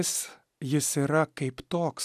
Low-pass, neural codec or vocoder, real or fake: 14.4 kHz; none; real